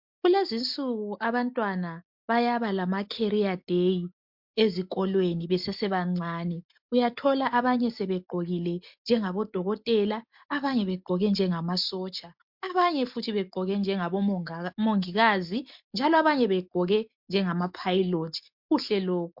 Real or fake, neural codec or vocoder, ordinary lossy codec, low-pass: real; none; MP3, 48 kbps; 5.4 kHz